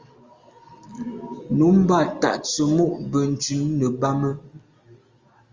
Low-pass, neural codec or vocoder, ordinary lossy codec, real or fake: 7.2 kHz; none; Opus, 32 kbps; real